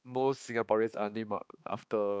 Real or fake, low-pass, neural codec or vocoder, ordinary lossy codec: fake; none; codec, 16 kHz, 2 kbps, X-Codec, HuBERT features, trained on balanced general audio; none